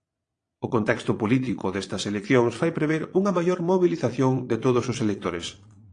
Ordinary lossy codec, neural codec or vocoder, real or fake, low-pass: AAC, 48 kbps; vocoder, 22.05 kHz, 80 mel bands, Vocos; fake; 9.9 kHz